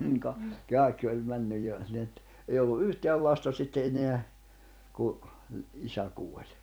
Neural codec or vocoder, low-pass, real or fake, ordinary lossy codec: vocoder, 44.1 kHz, 128 mel bands every 256 samples, BigVGAN v2; none; fake; none